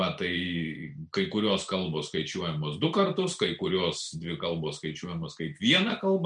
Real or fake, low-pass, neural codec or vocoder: real; 9.9 kHz; none